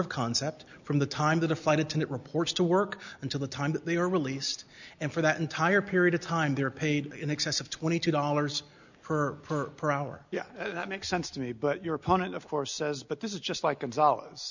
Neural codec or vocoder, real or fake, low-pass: none; real; 7.2 kHz